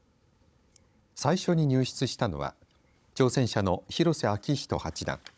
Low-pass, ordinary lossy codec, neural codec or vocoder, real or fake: none; none; codec, 16 kHz, 16 kbps, FunCodec, trained on Chinese and English, 50 frames a second; fake